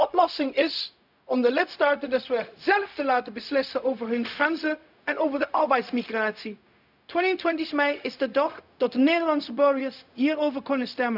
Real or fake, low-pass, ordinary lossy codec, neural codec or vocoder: fake; 5.4 kHz; none; codec, 16 kHz, 0.4 kbps, LongCat-Audio-Codec